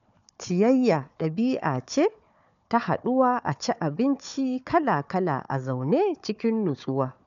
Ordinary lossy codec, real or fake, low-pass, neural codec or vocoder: none; fake; 7.2 kHz; codec, 16 kHz, 4 kbps, FunCodec, trained on Chinese and English, 50 frames a second